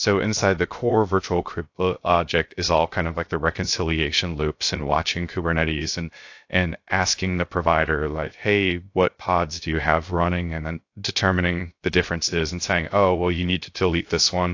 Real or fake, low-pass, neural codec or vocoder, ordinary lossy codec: fake; 7.2 kHz; codec, 16 kHz, 0.3 kbps, FocalCodec; AAC, 48 kbps